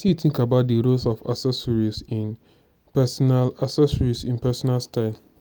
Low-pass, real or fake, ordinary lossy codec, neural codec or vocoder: none; real; none; none